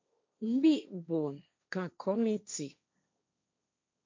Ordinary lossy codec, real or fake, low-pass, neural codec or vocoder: MP3, 64 kbps; fake; 7.2 kHz; codec, 16 kHz, 1.1 kbps, Voila-Tokenizer